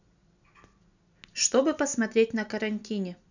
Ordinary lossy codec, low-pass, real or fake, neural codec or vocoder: none; 7.2 kHz; real; none